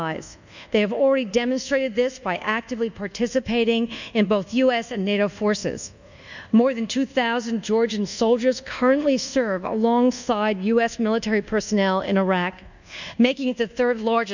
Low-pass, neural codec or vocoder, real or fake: 7.2 kHz; codec, 24 kHz, 1.2 kbps, DualCodec; fake